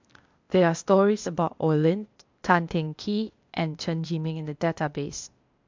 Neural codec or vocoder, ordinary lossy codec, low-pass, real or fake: codec, 16 kHz, 0.8 kbps, ZipCodec; MP3, 64 kbps; 7.2 kHz; fake